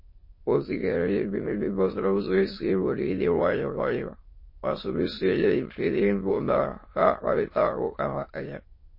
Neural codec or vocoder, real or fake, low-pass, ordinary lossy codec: autoencoder, 22.05 kHz, a latent of 192 numbers a frame, VITS, trained on many speakers; fake; 5.4 kHz; MP3, 24 kbps